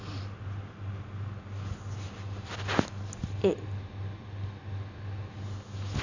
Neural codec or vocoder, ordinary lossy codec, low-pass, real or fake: none; none; 7.2 kHz; real